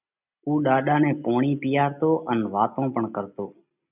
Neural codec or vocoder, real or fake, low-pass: none; real; 3.6 kHz